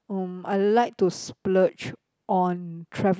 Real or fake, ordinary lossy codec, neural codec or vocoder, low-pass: real; none; none; none